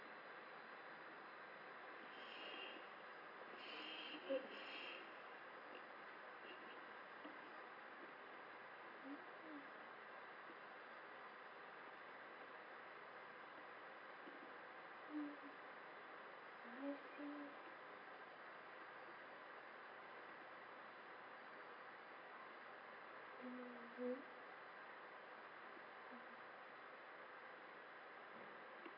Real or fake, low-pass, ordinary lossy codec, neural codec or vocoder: real; 5.4 kHz; none; none